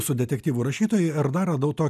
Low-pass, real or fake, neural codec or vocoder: 14.4 kHz; real; none